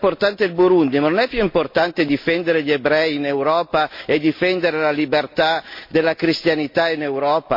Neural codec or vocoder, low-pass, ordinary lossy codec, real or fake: none; 5.4 kHz; MP3, 32 kbps; real